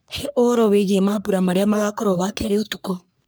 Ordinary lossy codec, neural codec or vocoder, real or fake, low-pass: none; codec, 44.1 kHz, 3.4 kbps, Pupu-Codec; fake; none